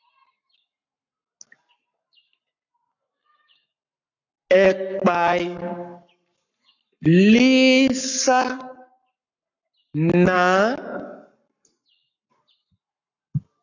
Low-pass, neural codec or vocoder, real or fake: 7.2 kHz; codec, 44.1 kHz, 7.8 kbps, Pupu-Codec; fake